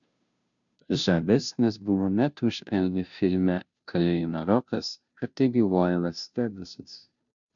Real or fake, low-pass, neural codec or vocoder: fake; 7.2 kHz; codec, 16 kHz, 0.5 kbps, FunCodec, trained on Chinese and English, 25 frames a second